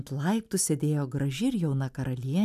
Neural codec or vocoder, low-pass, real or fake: none; 14.4 kHz; real